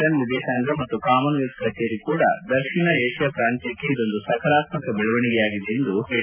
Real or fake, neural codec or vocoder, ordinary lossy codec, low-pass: real; none; none; 3.6 kHz